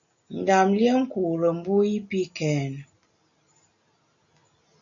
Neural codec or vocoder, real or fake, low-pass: none; real; 7.2 kHz